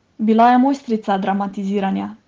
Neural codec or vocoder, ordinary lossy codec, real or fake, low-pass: none; Opus, 16 kbps; real; 7.2 kHz